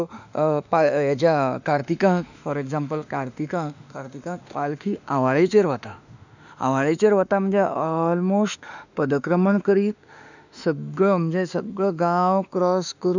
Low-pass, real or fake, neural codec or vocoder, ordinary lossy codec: 7.2 kHz; fake; autoencoder, 48 kHz, 32 numbers a frame, DAC-VAE, trained on Japanese speech; none